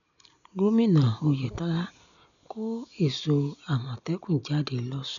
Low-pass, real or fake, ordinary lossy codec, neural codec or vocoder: 7.2 kHz; real; none; none